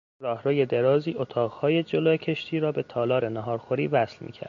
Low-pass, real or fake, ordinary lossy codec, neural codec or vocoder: 7.2 kHz; real; MP3, 64 kbps; none